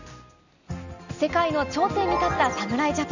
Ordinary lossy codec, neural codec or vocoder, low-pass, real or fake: none; none; 7.2 kHz; real